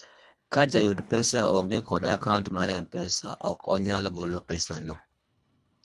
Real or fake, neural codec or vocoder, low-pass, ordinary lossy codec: fake; codec, 24 kHz, 1.5 kbps, HILCodec; none; none